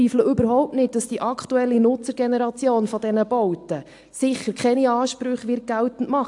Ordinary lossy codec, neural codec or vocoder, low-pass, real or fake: AAC, 64 kbps; none; 10.8 kHz; real